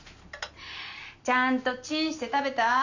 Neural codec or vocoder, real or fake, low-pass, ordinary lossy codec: none; real; 7.2 kHz; none